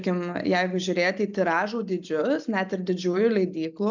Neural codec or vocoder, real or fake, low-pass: none; real; 7.2 kHz